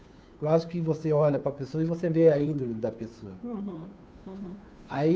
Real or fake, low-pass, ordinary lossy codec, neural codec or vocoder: fake; none; none; codec, 16 kHz, 2 kbps, FunCodec, trained on Chinese and English, 25 frames a second